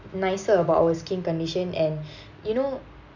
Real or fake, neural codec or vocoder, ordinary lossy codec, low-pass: real; none; none; 7.2 kHz